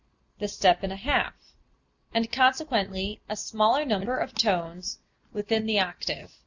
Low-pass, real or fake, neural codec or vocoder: 7.2 kHz; real; none